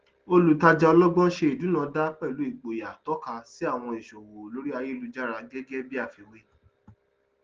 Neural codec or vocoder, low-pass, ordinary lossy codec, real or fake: none; 7.2 kHz; Opus, 16 kbps; real